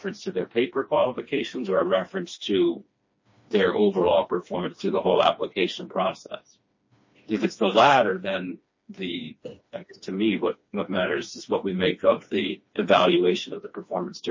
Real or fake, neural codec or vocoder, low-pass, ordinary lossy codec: fake; codec, 16 kHz, 2 kbps, FreqCodec, smaller model; 7.2 kHz; MP3, 32 kbps